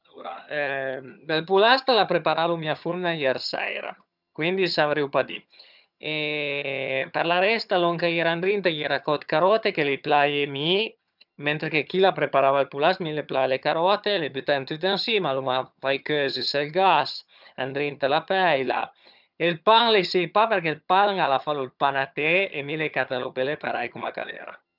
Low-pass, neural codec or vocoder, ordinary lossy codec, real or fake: 5.4 kHz; vocoder, 22.05 kHz, 80 mel bands, HiFi-GAN; none; fake